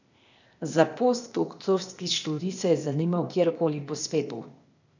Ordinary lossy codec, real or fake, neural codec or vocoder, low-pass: none; fake; codec, 16 kHz, 0.8 kbps, ZipCodec; 7.2 kHz